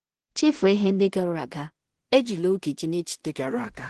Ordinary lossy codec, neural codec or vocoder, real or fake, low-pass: Opus, 16 kbps; codec, 16 kHz in and 24 kHz out, 0.4 kbps, LongCat-Audio-Codec, two codebook decoder; fake; 10.8 kHz